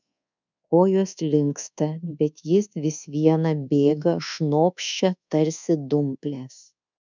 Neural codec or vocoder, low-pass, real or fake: codec, 24 kHz, 1.2 kbps, DualCodec; 7.2 kHz; fake